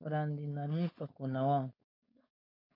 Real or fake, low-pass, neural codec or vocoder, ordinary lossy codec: fake; 5.4 kHz; codec, 16 kHz, 4.8 kbps, FACodec; MP3, 32 kbps